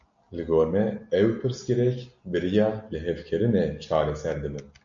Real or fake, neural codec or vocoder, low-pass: real; none; 7.2 kHz